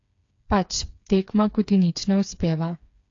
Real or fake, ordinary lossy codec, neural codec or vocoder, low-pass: fake; AAC, 48 kbps; codec, 16 kHz, 4 kbps, FreqCodec, smaller model; 7.2 kHz